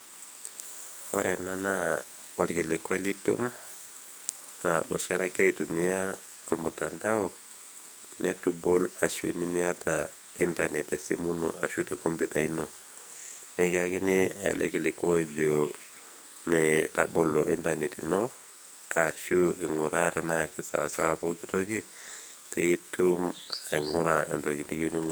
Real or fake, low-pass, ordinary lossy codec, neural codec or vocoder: fake; none; none; codec, 44.1 kHz, 2.6 kbps, SNAC